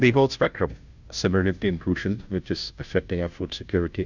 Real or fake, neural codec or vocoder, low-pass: fake; codec, 16 kHz, 0.5 kbps, FunCodec, trained on Chinese and English, 25 frames a second; 7.2 kHz